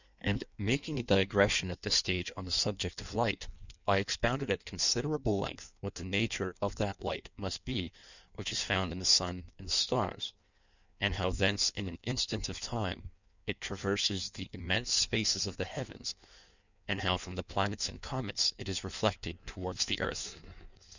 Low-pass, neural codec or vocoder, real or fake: 7.2 kHz; codec, 16 kHz in and 24 kHz out, 1.1 kbps, FireRedTTS-2 codec; fake